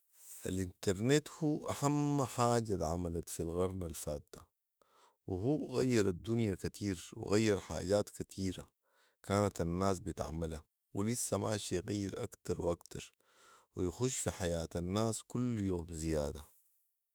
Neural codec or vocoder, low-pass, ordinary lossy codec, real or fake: autoencoder, 48 kHz, 32 numbers a frame, DAC-VAE, trained on Japanese speech; none; none; fake